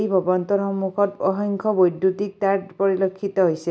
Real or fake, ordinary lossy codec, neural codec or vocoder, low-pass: real; none; none; none